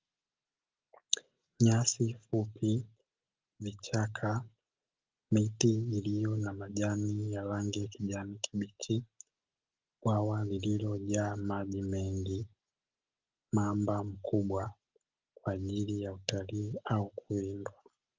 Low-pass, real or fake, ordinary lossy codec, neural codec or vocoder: 7.2 kHz; real; Opus, 24 kbps; none